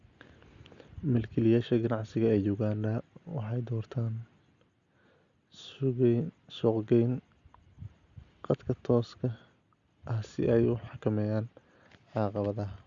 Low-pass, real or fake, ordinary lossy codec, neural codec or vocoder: 7.2 kHz; real; Opus, 64 kbps; none